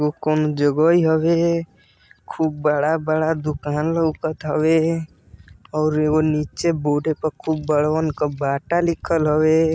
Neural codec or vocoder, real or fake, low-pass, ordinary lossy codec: none; real; none; none